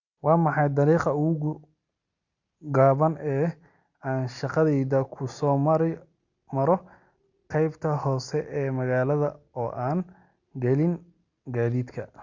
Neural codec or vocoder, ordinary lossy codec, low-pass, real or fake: none; Opus, 64 kbps; 7.2 kHz; real